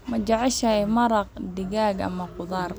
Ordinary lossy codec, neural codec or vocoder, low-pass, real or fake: none; none; none; real